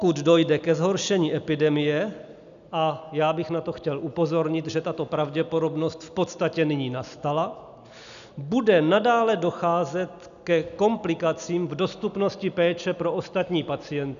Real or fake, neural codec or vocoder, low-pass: real; none; 7.2 kHz